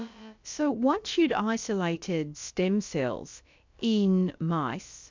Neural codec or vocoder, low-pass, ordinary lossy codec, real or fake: codec, 16 kHz, about 1 kbps, DyCAST, with the encoder's durations; 7.2 kHz; MP3, 64 kbps; fake